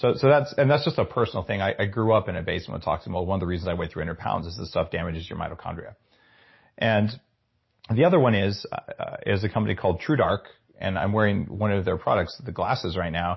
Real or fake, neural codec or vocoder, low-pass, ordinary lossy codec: real; none; 7.2 kHz; MP3, 24 kbps